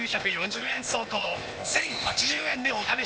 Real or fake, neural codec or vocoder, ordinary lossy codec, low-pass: fake; codec, 16 kHz, 0.8 kbps, ZipCodec; none; none